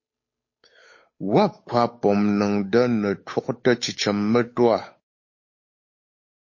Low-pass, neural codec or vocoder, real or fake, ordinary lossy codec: 7.2 kHz; codec, 16 kHz, 8 kbps, FunCodec, trained on Chinese and English, 25 frames a second; fake; MP3, 32 kbps